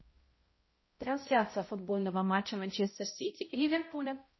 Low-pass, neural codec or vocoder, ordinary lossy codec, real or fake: 7.2 kHz; codec, 16 kHz, 0.5 kbps, X-Codec, HuBERT features, trained on balanced general audio; MP3, 24 kbps; fake